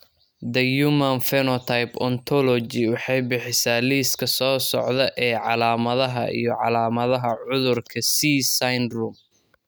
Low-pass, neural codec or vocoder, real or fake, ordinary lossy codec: none; none; real; none